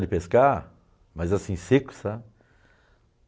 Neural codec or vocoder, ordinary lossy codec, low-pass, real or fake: none; none; none; real